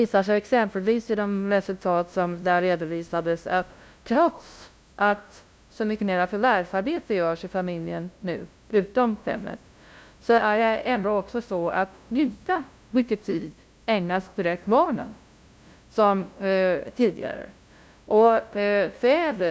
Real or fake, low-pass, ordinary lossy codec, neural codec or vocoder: fake; none; none; codec, 16 kHz, 0.5 kbps, FunCodec, trained on LibriTTS, 25 frames a second